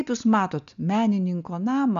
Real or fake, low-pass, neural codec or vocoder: real; 7.2 kHz; none